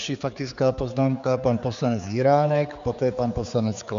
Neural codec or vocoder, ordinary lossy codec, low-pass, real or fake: codec, 16 kHz, 4 kbps, X-Codec, HuBERT features, trained on general audio; MP3, 64 kbps; 7.2 kHz; fake